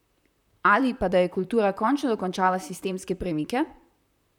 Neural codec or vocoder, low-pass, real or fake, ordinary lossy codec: vocoder, 44.1 kHz, 128 mel bands, Pupu-Vocoder; 19.8 kHz; fake; none